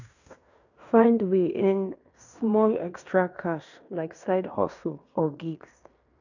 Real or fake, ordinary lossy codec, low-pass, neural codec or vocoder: fake; none; 7.2 kHz; codec, 16 kHz in and 24 kHz out, 0.9 kbps, LongCat-Audio-Codec, fine tuned four codebook decoder